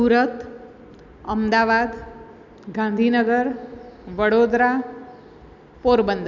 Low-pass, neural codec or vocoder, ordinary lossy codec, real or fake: 7.2 kHz; none; none; real